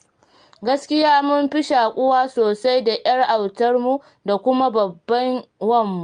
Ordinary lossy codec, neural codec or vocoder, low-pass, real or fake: Opus, 32 kbps; none; 9.9 kHz; real